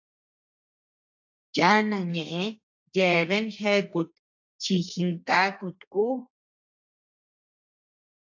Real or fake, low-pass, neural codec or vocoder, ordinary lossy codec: fake; 7.2 kHz; codec, 32 kHz, 1.9 kbps, SNAC; AAC, 48 kbps